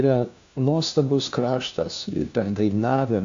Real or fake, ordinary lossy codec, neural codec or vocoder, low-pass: fake; AAC, 64 kbps; codec, 16 kHz, 1 kbps, FunCodec, trained on LibriTTS, 50 frames a second; 7.2 kHz